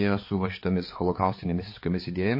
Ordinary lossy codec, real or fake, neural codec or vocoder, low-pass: MP3, 24 kbps; fake; codec, 16 kHz, 4 kbps, X-Codec, HuBERT features, trained on balanced general audio; 5.4 kHz